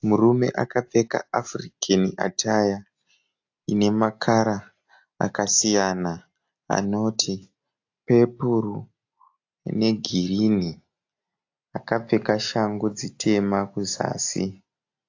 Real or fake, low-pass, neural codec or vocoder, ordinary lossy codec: real; 7.2 kHz; none; AAC, 48 kbps